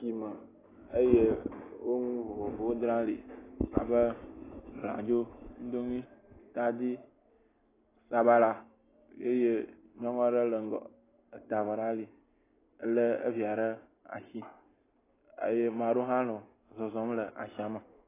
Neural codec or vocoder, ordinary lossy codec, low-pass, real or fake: none; AAC, 16 kbps; 3.6 kHz; real